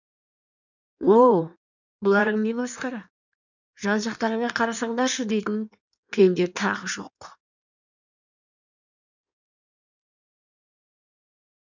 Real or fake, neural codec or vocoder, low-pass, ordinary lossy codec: fake; codec, 16 kHz in and 24 kHz out, 1.1 kbps, FireRedTTS-2 codec; 7.2 kHz; none